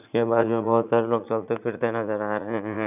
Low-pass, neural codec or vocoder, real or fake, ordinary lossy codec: 3.6 kHz; vocoder, 44.1 kHz, 80 mel bands, Vocos; fake; none